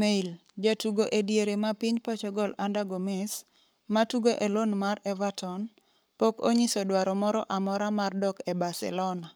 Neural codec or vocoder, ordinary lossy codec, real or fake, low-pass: codec, 44.1 kHz, 7.8 kbps, Pupu-Codec; none; fake; none